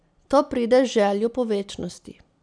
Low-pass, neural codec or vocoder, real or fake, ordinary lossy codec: 9.9 kHz; none; real; none